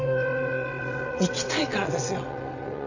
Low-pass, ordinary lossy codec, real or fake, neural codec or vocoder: 7.2 kHz; none; fake; vocoder, 22.05 kHz, 80 mel bands, WaveNeXt